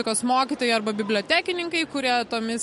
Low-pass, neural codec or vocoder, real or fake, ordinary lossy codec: 14.4 kHz; none; real; MP3, 48 kbps